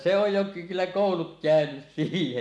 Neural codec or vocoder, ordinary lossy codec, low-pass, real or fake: none; none; none; real